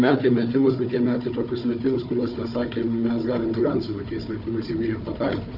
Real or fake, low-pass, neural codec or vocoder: fake; 5.4 kHz; codec, 16 kHz, 4 kbps, FunCodec, trained on Chinese and English, 50 frames a second